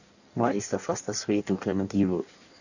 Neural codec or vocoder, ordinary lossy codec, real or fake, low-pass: codec, 16 kHz in and 24 kHz out, 1.1 kbps, FireRedTTS-2 codec; none; fake; 7.2 kHz